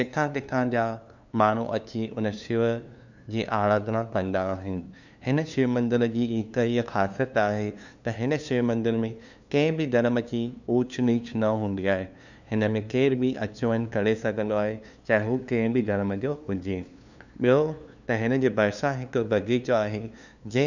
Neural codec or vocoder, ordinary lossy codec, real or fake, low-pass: codec, 16 kHz, 2 kbps, FunCodec, trained on LibriTTS, 25 frames a second; none; fake; 7.2 kHz